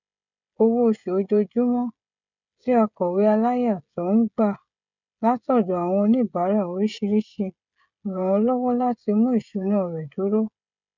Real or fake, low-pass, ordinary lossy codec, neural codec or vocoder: fake; 7.2 kHz; none; codec, 16 kHz, 16 kbps, FreqCodec, smaller model